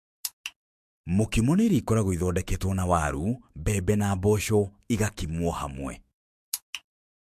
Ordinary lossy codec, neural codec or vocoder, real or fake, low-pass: MP3, 64 kbps; autoencoder, 48 kHz, 128 numbers a frame, DAC-VAE, trained on Japanese speech; fake; 14.4 kHz